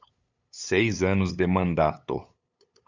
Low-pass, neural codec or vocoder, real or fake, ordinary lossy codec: 7.2 kHz; codec, 16 kHz, 8 kbps, FunCodec, trained on LibriTTS, 25 frames a second; fake; Opus, 64 kbps